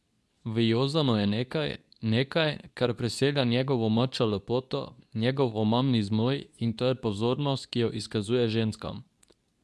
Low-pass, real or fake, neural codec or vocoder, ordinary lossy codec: none; fake; codec, 24 kHz, 0.9 kbps, WavTokenizer, medium speech release version 2; none